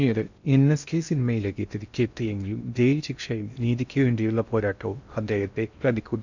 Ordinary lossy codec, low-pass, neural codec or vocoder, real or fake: none; 7.2 kHz; codec, 16 kHz in and 24 kHz out, 0.6 kbps, FocalCodec, streaming, 2048 codes; fake